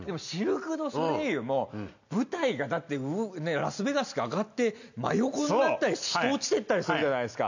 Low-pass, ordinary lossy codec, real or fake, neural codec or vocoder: 7.2 kHz; none; real; none